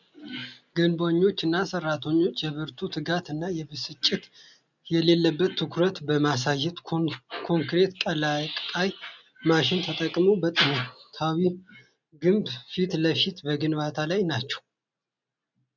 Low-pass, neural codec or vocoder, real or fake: 7.2 kHz; none; real